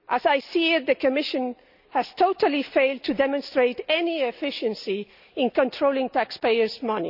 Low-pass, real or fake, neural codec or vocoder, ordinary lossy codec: 5.4 kHz; real; none; none